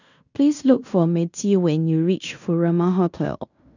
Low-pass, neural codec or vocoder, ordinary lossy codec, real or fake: 7.2 kHz; codec, 16 kHz in and 24 kHz out, 0.9 kbps, LongCat-Audio-Codec, fine tuned four codebook decoder; none; fake